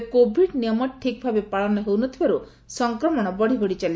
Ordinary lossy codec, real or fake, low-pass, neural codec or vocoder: none; real; none; none